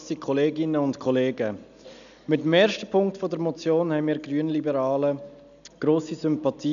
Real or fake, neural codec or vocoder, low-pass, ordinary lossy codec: real; none; 7.2 kHz; none